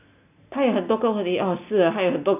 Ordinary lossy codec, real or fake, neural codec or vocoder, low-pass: none; real; none; 3.6 kHz